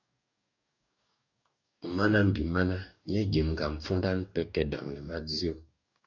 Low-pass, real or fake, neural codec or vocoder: 7.2 kHz; fake; codec, 44.1 kHz, 2.6 kbps, DAC